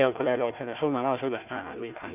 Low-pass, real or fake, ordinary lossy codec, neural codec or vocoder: 3.6 kHz; fake; none; codec, 16 kHz, 1 kbps, FunCodec, trained on Chinese and English, 50 frames a second